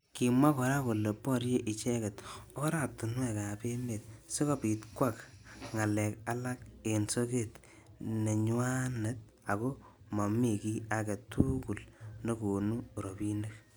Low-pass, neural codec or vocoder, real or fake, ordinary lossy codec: none; none; real; none